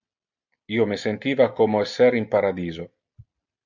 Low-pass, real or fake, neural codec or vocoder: 7.2 kHz; real; none